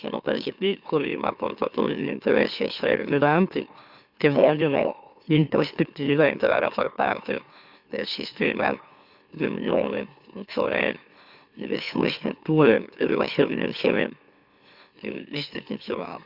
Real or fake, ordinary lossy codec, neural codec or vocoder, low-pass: fake; Opus, 64 kbps; autoencoder, 44.1 kHz, a latent of 192 numbers a frame, MeloTTS; 5.4 kHz